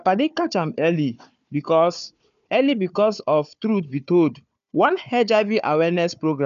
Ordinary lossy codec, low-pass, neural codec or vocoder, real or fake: none; 7.2 kHz; codec, 16 kHz, 4 kbps, FunCodec, trained on Chinese and English, 50 frames a second; fake